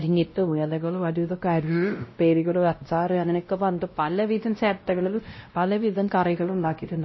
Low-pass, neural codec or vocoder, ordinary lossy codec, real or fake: 7.2 kHz; codec, 16 kHz, 0.5 kbps, X-Codec, WavLM features, trained on Multilingual LibriSpeech; MP3, 24 kbps; fake